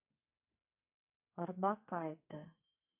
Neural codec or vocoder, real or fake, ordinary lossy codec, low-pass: codec, 44.1 kHz, 2.6 kbps, SNAC; fake; none; 3.6 kHz